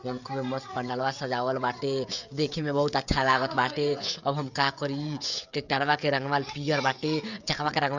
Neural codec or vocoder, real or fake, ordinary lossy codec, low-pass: codec, 16 kHz, 16 kbps, FreqCodec, smaller model; fake; Opus, 64 kbps; 7.2 kHz